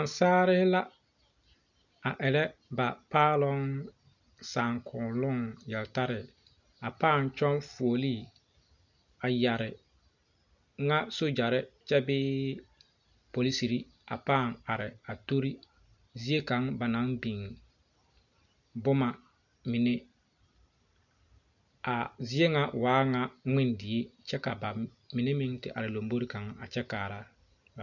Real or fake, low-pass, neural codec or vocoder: real; 7.2 kHz; none